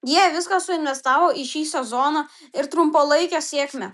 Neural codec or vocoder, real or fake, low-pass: none; real; 14.4 kHz